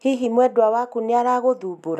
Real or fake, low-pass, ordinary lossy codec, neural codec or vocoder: real; 14.4 kHz; none; none